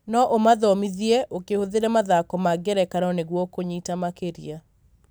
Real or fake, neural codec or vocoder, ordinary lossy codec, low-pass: real; none; none; none